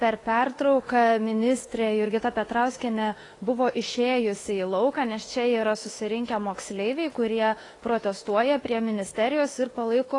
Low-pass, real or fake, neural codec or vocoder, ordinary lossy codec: 10.8 kHz; fake; autoencoder, 48 kHz, 32 numbers a frame, DAC-VAE, trained on Japanese speech; AAC, 32 kbps